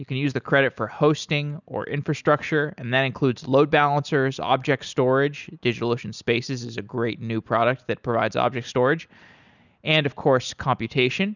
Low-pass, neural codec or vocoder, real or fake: 7.2 kHz; none; real